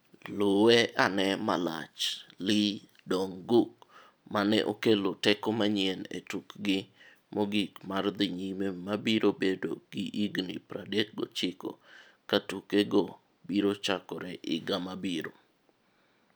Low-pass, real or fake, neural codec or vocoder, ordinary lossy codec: none; real; none; none